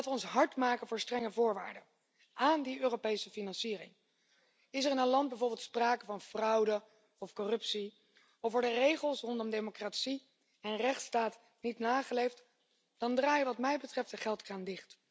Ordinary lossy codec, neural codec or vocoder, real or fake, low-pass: none; none; real; none